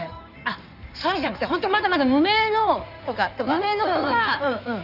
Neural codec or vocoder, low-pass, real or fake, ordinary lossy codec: codec, 16 kHz in and 24 kHz out, 2.2 kbps, FireRedTTS-2 codec; 5.4 kHz; fake; none